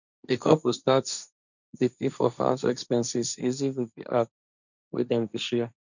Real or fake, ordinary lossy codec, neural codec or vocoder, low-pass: fake; none; codec, 16 kHz, 1.1 kbps, Voila-Tokenizer; 7.2 kHz